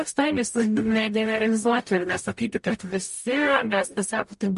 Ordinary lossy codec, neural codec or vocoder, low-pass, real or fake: MP3, 48 kbps; codec, 44.1 kHz, 0.9 kbps, DAC; 14.4 kHz; fake